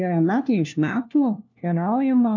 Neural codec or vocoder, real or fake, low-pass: codec, 16 kHz, 1 kbps, FunCodec, trained on LibriTTS, 50 frames a second; fake; 7.2 kHz